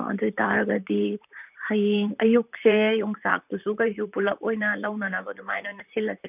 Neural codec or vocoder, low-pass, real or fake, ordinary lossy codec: vocoder, 44.1 kHz, 128 mel bands, Pupu-Vocoder; 3.6 kHz; fake; none